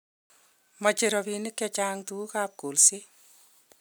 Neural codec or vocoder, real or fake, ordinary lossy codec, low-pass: none; real; none; none